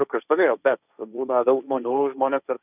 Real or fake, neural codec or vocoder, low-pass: fake; codec, 16 kHz, 1.1 kbps, Voila-Tokenizer; 3.6 kHz